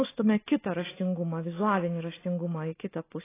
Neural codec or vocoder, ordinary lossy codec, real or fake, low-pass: none; AAC, 16 kbps; real; 3.6 kHz